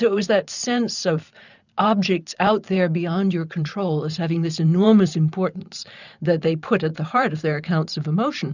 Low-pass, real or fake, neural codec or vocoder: 7.2 kHz; fake; vocoder, 44.1 kHz, 128 mel bands every 512 samples, BigVGAN v2